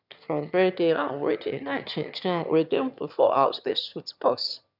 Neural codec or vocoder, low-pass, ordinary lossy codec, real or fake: autoencoder, 22.05 kHz, a latent of 192 numbers a frame, VITS, trained on one speaker; 5.4 kHz; none; fake